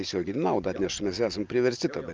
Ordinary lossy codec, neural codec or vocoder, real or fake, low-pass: Opus, 24 kbps; none; real; 7.2 kHz